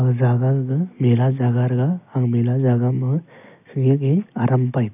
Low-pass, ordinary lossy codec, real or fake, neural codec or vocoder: 3.6 kHz; none; real; none